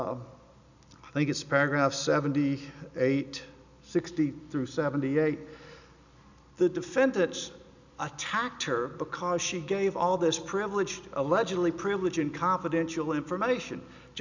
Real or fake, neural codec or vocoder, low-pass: real; none; 7.2 kHz